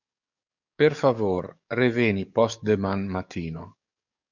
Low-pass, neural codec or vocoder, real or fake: 7.2 kHz; codec, 44.1 kHz, 7.8 kbps, DAC; fake